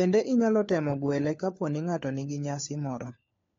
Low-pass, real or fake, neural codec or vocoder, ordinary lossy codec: 7.2 kHz; fake; codec, 16 kHz, 4 kbps, FunCodec, trained on LibriTTS, 50 frames a second; AAC, 32 kbps